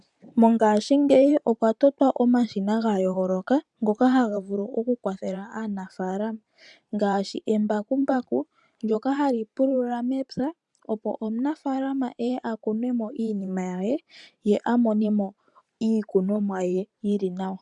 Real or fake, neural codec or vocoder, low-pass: fake; vocoder, 44.1 kHz, 128 mel bands every 512 samples, BigVGAN v2; 10.8 kHz